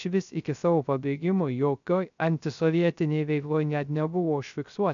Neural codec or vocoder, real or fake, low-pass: codec, 16 kHz, 0.3 kbps, FocalCodec; fake; 7.2 kHz